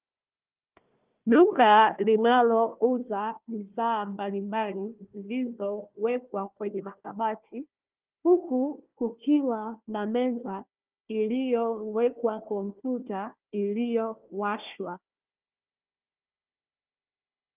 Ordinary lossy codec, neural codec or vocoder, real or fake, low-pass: Opus, 24 kbps; codec, 16 kHz, 1 kbps, FunCodec, trained on Chinese and English, 50 frames a second; fake; 3.6 kHz